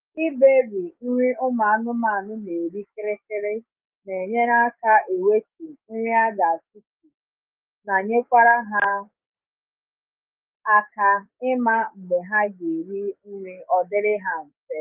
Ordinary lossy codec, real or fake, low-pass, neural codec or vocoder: Opus, 32 kbps; fake; 3.6 kHz; codec, 16 kHz, 6 kbps, DAC